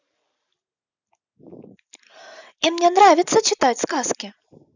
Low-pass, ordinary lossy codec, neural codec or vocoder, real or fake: 7.2 kHz; none; none; real